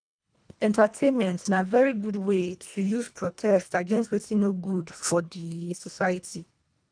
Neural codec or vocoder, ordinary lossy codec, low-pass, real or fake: codec, 24 kHz, 1.5 kbps, HILCodec; none; 9.9 kHz; fake